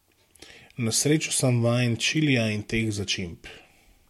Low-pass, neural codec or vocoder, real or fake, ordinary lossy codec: 19.8 kHz; none; real; MP3, 64 kbps